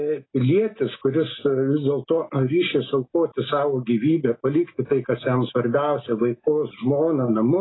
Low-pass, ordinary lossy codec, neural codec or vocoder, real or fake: 7.2 kHz; AAC, 16 kbps; none; real